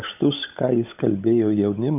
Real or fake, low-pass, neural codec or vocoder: fake; 3.6 kHz; codec, 16 kHz, 16 kbps, FunCodec, trained on LibriTTS, 50 frames a second